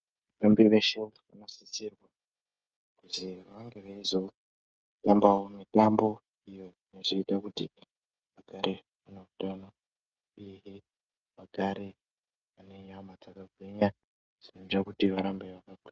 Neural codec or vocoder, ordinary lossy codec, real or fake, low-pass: codec, 16 kHz, 16 kbps, FreqCodec, smaller model; Opus, 24 kbps; fake; 7.2 kHz